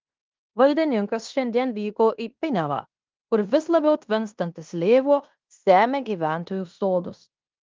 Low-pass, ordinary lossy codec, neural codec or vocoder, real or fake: 7.2 kHz; Opus, 32 kbps; codec, 16 kHz in and 24 kHz out, 0.9 kbps, LongCat-Audio-Codec, fine tuned four codebook decoder; fake